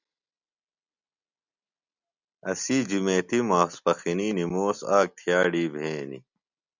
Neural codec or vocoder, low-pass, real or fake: none; 7.2 kHz; real